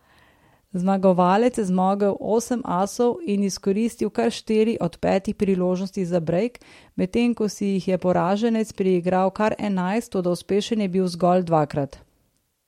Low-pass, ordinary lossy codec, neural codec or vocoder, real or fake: 19.8 kHz; MP3, 64 kbps; none; real